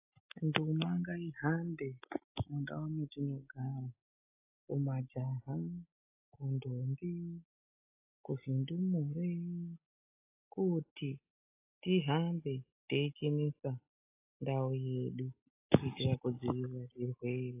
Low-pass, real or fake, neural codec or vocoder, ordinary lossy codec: 3.6 kHz; real; none; AAC, 32 kbps